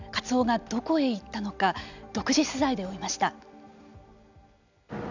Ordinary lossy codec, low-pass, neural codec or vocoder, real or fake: none; 7.2 kHz; none; real